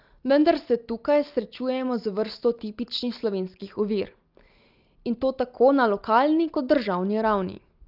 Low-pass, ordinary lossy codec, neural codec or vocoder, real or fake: 5.4 kHz; Opus, 24 kbps; none; real